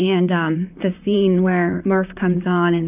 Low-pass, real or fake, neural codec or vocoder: 3.6 kHz; fake; vocoder, 44.1 kHz, 128 mel bands, Pupu-Vocoder